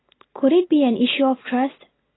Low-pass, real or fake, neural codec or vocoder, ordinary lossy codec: 7.2 kHz; real; none; AAC, 16 kbps